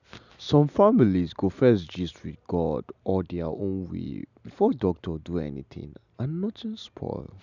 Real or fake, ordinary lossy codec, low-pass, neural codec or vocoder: real; none; 7.2 kHz; none